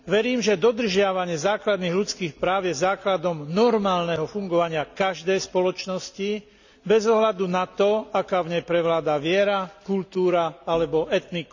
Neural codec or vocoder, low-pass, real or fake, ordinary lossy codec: none; 7.2 kHz; real; none